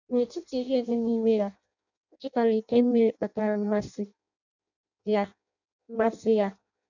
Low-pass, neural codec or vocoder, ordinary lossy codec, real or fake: 7.2 kHz; codec, 16 kHz in and 24 kHz out, 0.6 kbps, FireRedTTS-2 codec; none; fake